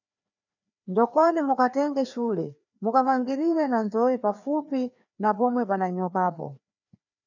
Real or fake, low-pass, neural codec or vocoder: fake; 7.2 kHz; codec, 16 kHz, 2 kbps, FreqCodec, larger model